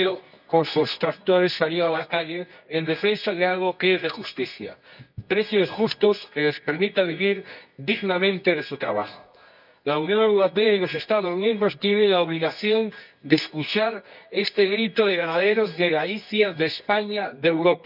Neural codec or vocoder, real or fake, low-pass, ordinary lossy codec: codec, 24 kHz, 0.9 kbps, WavTokenizer, medium music audio release; fake; 5.4 kHz; none